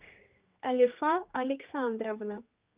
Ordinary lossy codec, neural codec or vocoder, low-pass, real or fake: Opus, 32 kbps; codec, 16 kHz, 2 kbps, X-Codec, HuBERT features, trained on general audio; 3.6 kHz; fake